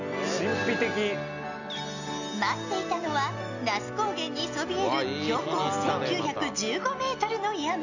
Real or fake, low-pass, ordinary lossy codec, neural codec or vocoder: real; 7.2 kHz; none; none